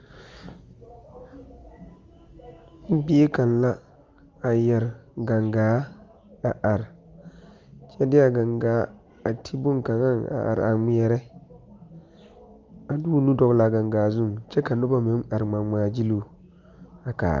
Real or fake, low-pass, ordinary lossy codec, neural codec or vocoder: real; 7.2 kHz; Opus, 32 kbps; none